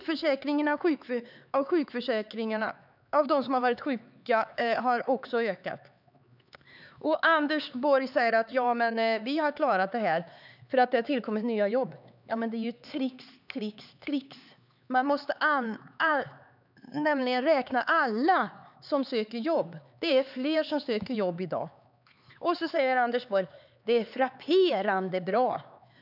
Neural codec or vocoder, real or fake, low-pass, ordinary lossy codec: codec, 16 kHz, 4 kbps, X-Codec, HuBERT features, trained on LibriSpeech; fake; 5.4 kHz; none